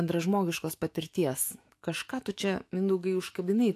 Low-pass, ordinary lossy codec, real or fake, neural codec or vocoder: 14.4 kHz; AAC, 64 kbps; fake; autoencoder, 48 kHz, 128 numbers a frame, DAC-VAE, trained on Japanese speech